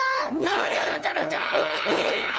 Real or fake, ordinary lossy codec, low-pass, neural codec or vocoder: fake; none; none; codec, 16 kHz, 2 kbps, FunCodec, trained on LibriTTS, 25 frames a second